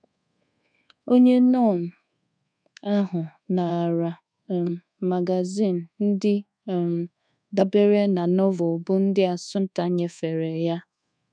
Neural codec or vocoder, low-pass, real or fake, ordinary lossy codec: codec, 24 kHz, 1.2 kbps, DualCodec; 9.9 kHz; fake; none